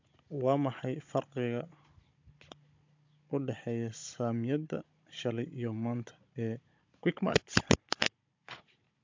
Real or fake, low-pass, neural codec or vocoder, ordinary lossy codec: fake; 7.2 kHz; codec, 16 kHz, 16 kbps, FunCodec, trained on Chinese and English, 50 frames a second; MP3, 48 kbps